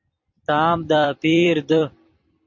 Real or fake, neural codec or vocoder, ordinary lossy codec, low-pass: fake; vocoder, 44.1 kHz, 128 mel bands every 256 samples, BigVGAN v2; MP3, 48 kbps; 7.2 kHz